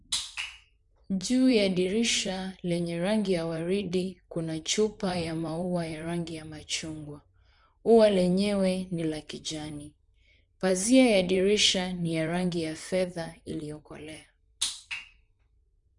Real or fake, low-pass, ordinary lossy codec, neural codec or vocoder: fake; 10.8 kHz; none; vocoder, 44.1 kHz, 128 mel bands, Pupu-Vocoder